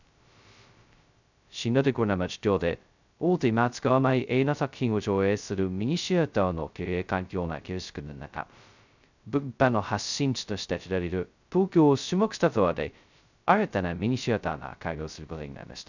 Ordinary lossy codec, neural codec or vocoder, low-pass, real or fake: none; codec, 16 kHz, 0.2 kbps, FocalCodec; 7.2 kHz; fake